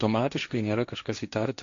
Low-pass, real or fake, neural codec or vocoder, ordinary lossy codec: 7.2 kHz; fake; codec, 16 kHz, 1.1 kbps, Voila-Tokenizer; AAC, 48 kbps